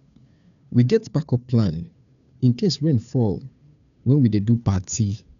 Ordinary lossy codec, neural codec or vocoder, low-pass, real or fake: none; codec, 16 kHz, 2 kbps, FunCodec, trained on Chinese and English, 25 frames a second; 7.2 kHz; fake